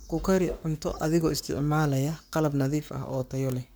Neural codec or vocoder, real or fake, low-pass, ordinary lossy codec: codec, 44.1 kHz, 7.8 kbps, Pupu-Codec; fake; none; none